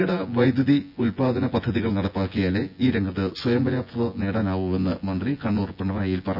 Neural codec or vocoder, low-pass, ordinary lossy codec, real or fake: vocoder, 24 kHz, 100 mel bands, Vocos; 5.4 kHz; none; fake